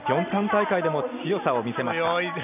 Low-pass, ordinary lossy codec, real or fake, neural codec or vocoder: 3.6 kHz; none; real; none